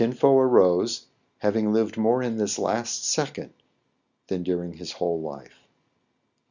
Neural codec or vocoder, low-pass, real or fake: none; 7.2 kHz; real